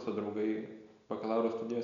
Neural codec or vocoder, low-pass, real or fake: none; 7.2 kHz; real